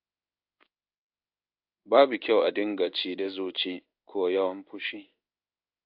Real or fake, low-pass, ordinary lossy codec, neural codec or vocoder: fake; 5.4 kHz; AAC, 48 kbps; codec, 16 kHz in and 24 kHz out, 1 kbps, XY-Tokenizer